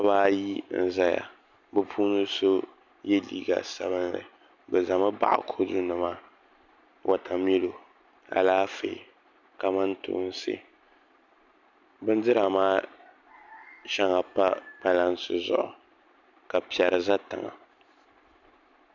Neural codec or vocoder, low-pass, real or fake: none; 7.2 kHz; real